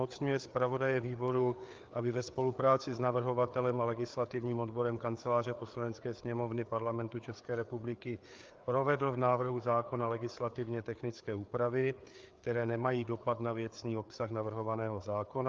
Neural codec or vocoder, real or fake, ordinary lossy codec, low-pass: codec, 16 kHz, 4 kbps, FreqCodec, larger model; fake; Opus, 24 kbps; 7.2 kHz